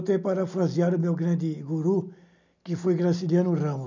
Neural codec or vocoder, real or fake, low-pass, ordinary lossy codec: none; real; 7.2 kHz; none